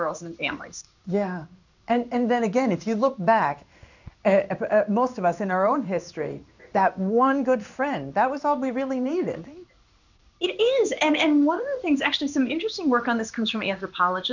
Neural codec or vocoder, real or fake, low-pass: codec, 16 kHz in and 24 kHz out, 1 kbps, XY-Tokenizer; fake; 7.2 kHz